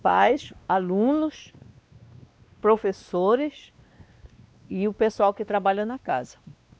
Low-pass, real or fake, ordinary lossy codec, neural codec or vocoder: none; fake; none; codec, 16 kHz, 2 kbps, X-Codec, WavLM features, trained on Multilingual LibriSpeech